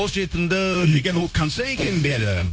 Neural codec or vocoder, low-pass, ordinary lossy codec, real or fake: codec, 16 kHz, 0.9 kbps, LongCat-Audio-Codec; none; none; fake